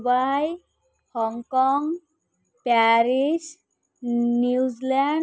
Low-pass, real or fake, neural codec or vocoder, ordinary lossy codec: none; real; none; none